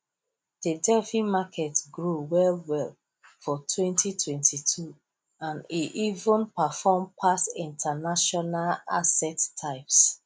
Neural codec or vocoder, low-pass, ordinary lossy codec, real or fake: none; none; none; real